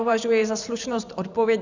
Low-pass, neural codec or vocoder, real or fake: 7.2 kHz; vocoder, 44.1 kHz, 128 mel bands every 512 samples, BigVGAN v2; fake